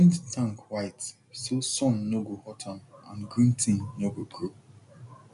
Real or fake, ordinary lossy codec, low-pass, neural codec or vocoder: real; none; 10.8 kHz; none